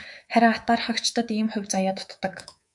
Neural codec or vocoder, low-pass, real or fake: codec, 24 kHz, 3.1 kbps, DualCodec; 10.8 kHz; fake